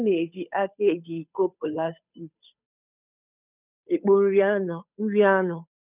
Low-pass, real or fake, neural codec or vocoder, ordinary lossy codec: 3.6 kHz; fake; codec, 16 kHz, 8 kbps, FunCodec, trained on Chinese and English, 25 frames a second; none